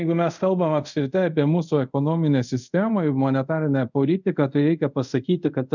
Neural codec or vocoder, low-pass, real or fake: codec, 24 kHz, 0.5 kbps, DualCodec; 7.2 kHz; fake